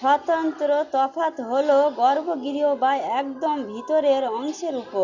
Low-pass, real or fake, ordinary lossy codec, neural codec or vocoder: 7.2 kHz; real; none; none